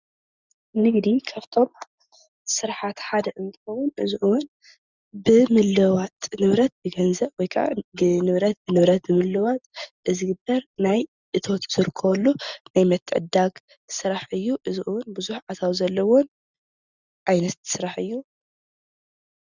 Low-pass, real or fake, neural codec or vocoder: 7.2 kHz; real; none